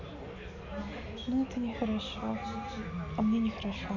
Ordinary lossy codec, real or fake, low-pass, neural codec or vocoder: none; real; 7.2 kHz; none